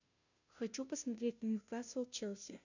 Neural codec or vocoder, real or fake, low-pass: codec, 16 kHz, 0.5 kbps, FunCodec, trained on Chinese and English, 25 frames a second; fake; 7.2 kHz